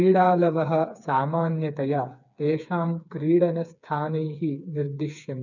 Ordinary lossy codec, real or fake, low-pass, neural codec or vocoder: none; fake; 7.2 kHz; codec, 16 kHz, 4 kbps, FreqCodec, smaller model